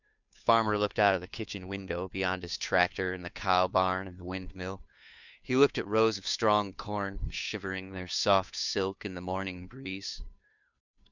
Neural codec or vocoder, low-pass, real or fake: codec, 16 kHz, 2 kbps, FunCodec, trained on Chinese and English, 25 frames a second; 7.2 kHz; fake